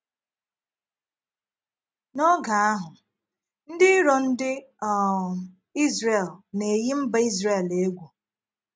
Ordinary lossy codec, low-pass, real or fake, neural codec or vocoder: none; none; real; none